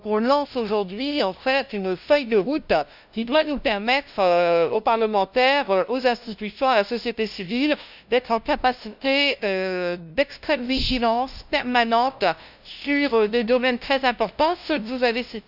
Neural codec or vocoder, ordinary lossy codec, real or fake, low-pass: codec, 16 kHz, 0.5 kbps, FunCodec, trained on LibriTTS, 25 frames a second; none; fake; 5.4 kHz